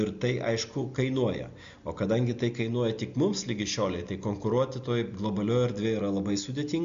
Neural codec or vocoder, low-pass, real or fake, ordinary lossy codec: none; 7.2 kHz; real; MP3, 64 kbps